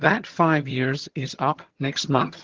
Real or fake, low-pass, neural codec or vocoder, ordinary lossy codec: fake; 7.2 kHz; vocoder, 22.05 kHz, 80 mel bands, HiFi-GAN; Opus, 16 kbps